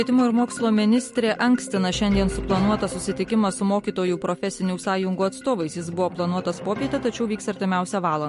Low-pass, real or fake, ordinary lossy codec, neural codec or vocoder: 14.4 kHz; real; MP3, 48 kbps; none